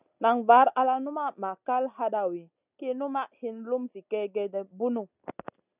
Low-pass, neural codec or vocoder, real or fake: 3.6 kHz; none; real